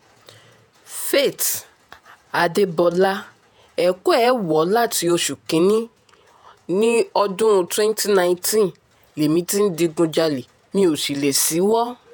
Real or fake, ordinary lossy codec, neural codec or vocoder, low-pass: fake; none; vocoder, 48 kHz, 128 mel bands, Vocos; none